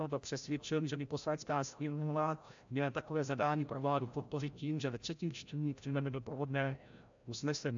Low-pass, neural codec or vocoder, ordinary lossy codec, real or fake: 7.2 kHz; codec, 16 kHz, 0.5 kbps, FreqCodec, larger model; AAC, 64 kbps; fake